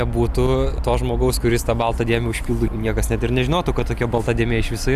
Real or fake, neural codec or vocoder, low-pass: real; none; 14.4 kHz